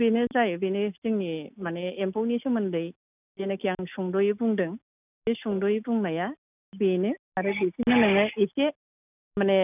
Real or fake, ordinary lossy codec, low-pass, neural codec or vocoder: real; none; 3.6 kHz; none